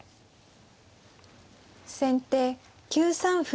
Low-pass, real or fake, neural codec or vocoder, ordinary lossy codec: none; real; none; none